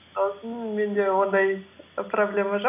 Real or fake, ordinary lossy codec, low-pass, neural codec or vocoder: real; none; 3.6 kHz; none